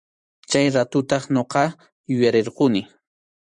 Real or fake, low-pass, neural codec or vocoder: fake; 10.8 kHz; vocoder, 44.1 kHz, 128 mel bands every 512 samples, BigVGAN v2